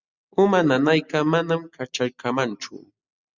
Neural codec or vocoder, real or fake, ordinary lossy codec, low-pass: none; real; Opus, 64 kbps; 7.2 kHz